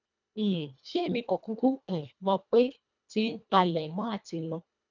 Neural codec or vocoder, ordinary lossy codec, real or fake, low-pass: codec, 24 kHz, 1.5 kbps, HILCodec; none; fake; 7.2 kHz